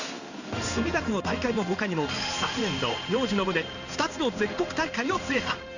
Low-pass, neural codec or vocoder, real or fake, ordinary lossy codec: 7.2 kHz; codec, 16 kHz in and 24 kHz out, 1 kbps, XY-Tokenizer; fake; none